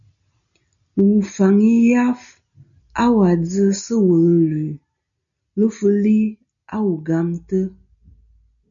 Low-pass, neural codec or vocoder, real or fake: 7.2 kHz; none; real